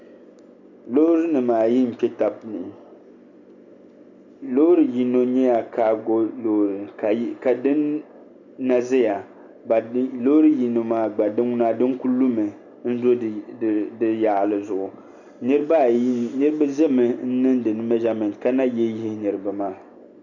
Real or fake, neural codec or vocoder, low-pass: real; none; 7.2 kHz